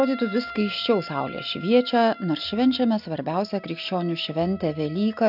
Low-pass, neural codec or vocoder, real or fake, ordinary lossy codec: 5.4 kHz; none; real; AAC, 48 kbps